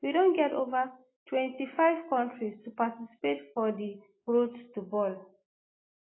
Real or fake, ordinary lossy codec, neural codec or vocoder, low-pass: real; AAC, 16 kbps; none; 7.2 kHz